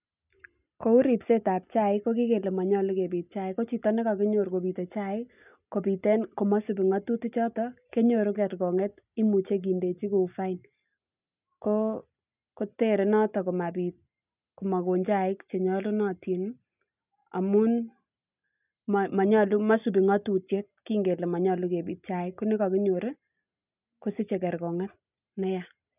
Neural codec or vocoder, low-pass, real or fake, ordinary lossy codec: none; 3.6 kHz; real; none